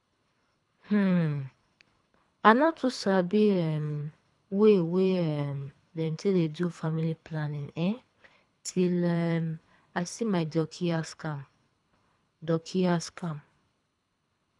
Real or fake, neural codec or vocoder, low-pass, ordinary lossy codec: fake; codec, 24 kHz, 3 kbps, HILCodec; 10.8 kHz; none